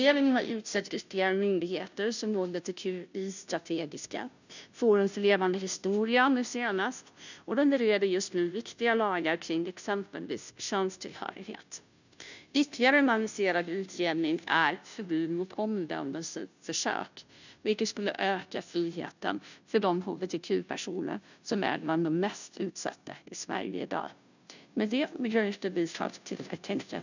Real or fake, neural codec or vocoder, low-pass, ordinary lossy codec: fake; codec, 16 kHz, 0.5 kbps, FunCodec, trained on Chinese and English, 25 frames a second; 7.2 kHz; none